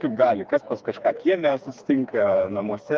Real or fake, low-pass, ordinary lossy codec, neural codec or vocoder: fake; 7.2 kHz; Opus, 24 kbps; codec, 16 kHz, 2 kbps, FreqCodec, smaller model